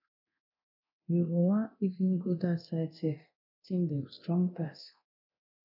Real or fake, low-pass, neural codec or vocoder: fake; 5.4 kHz; codec, 24 kHz, 0.9 kbps, DualCodec